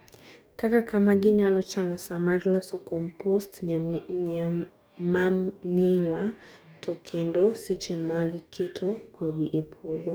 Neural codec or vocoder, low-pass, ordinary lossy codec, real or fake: codec, 44.1 kHz, 2.6 kbps, DAC; none; none; fake